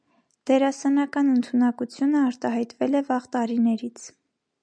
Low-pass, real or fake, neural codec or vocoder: 9.9 kHz; real; none